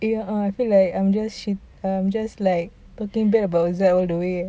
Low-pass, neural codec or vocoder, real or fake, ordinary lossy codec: none; none; real; none